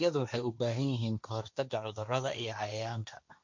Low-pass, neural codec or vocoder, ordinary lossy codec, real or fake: 7.2 kHz; codec, 16 kHz, 1.1 kbps, Voila-Tokenizer; MP3, 64 kbps; fake